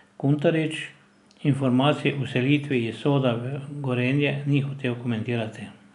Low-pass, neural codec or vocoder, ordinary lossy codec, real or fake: 10.8 kHz; none; none; real